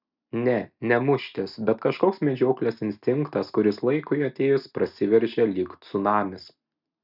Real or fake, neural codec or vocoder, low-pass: real; none; 5.4 kHz